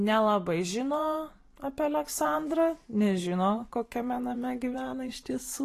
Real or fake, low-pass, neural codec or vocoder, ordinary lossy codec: fake; 14.4 kHz; vocoder, 44.1 kHz, 128 mel bands every 512 samples, BigVGAN v2; AAC, 48 kbps